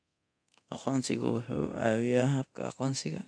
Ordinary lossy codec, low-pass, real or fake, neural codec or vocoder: none; 9.9 kHz; fake; codec, 24 kHz, 0.9 kbps, DualCodec